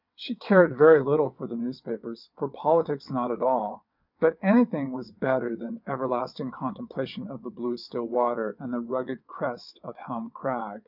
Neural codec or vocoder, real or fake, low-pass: vocoder, 22.05 kHz, 80 mel bands, WaveNeXt; fake; 5.4 kHz